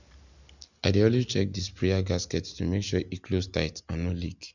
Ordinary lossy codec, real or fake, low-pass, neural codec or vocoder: none; real; 7.2 kHz; none